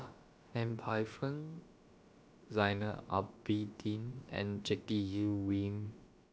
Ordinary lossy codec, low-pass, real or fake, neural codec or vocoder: none; none; fake; codec, 16 kHz, about 1 kbps, DyCAST, with the encoder's durations